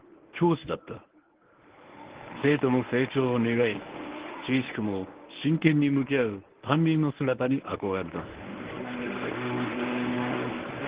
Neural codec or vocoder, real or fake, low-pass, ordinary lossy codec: codec, 24 kHz, 0.9 kbps, WavTokenizer, medium speech release version 1; fake; 3.6 kHz; Opus, 16 kbps